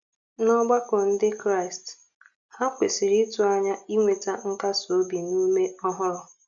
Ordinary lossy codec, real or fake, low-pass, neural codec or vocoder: none; real; 7.2 kHz; none